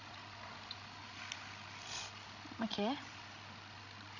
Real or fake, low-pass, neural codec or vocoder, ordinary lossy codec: fake; 7.2 kHz; codec, 16 kHz, 16 kbps, FreqCodec, larger model; none